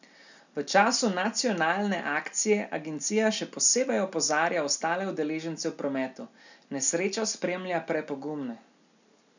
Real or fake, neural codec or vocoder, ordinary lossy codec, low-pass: real; none; none; 7.2 kHz